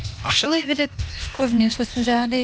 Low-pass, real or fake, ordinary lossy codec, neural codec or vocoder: none; fake; none; codec, 16 kHz, 0.8 kbps, ZipCodec